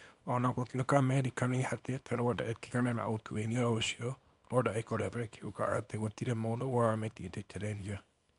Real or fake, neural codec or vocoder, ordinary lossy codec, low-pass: fake; codec, 24 kHz, 0.9 kbps, WavTokenizer, small release; none; 10.8 kHz